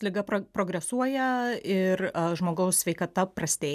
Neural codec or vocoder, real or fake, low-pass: none; real; 14.4 kHz